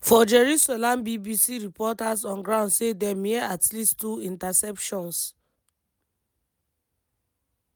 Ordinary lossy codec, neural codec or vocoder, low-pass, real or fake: none; none; none; real